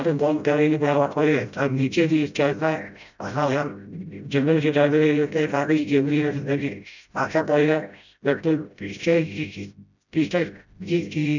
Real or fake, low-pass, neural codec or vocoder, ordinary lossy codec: fake; 7.2 kHz; codec, 16 kHz, 0.5 kbps, FreqCodec, smaller model; none